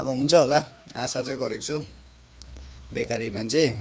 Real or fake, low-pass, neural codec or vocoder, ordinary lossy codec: fake; none; codec, 16 kHz, 2 kbps, FreqCodec, larger model; none